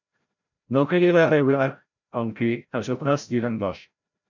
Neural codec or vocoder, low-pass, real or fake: codec, 16 kHz, 0.5 kbps, FreqCodec, larger model; 7.2 kHz; fake